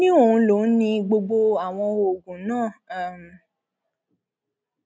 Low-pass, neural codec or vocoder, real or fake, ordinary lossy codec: none; none; real; none